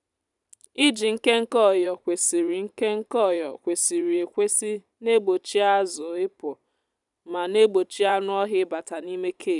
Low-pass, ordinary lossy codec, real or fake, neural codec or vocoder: 10.8 kHz; none; fake; vocoder, 44.1 kHz, 128 mel bands every 512 samples, BigVGAN v2